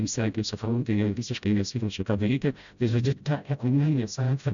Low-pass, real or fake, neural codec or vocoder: 7.2 kHz; fake; codec, 16 kHz, 0.5 kbps, FreqCodec, smaller model